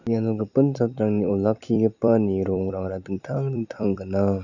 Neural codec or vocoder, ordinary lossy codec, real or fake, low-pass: vocoder, 44.1 kHz, 128 mel bands every 256 samples, BigVGAN v2; none; fake; 7.2 kHz